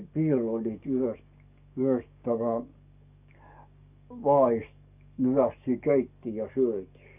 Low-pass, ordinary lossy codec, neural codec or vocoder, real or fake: 3.6 kHz; none; codec, 44.1 kHz, 7.8 kbps, DAC; fake